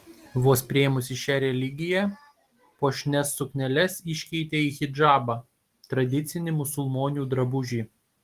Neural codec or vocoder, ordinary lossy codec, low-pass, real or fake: none; Opus, 24 kbps; 14.4 kHz; real